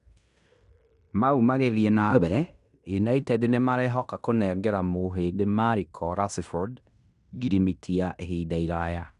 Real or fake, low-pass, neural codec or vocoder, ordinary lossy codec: fake; 10.8 kHz; codec, 16 kHz in and 24 kHz out, 0.9 kbps, LongCat-Audio-Codec, fine tuned four codebook decoder; none